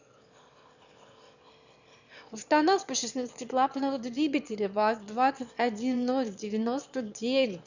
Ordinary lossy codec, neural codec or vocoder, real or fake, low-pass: none; autoencoder, 22.05 kHz, a latent of 192 numbers a frame, VITS, trained on one speaker; fake; 7.2 kHz